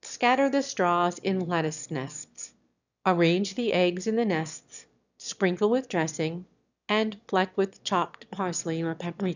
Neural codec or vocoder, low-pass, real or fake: autoencoder, 22.05 kHz, a latent of 192 numbers a frame, VITS, trained on one speaker; 7.2 kHz; fake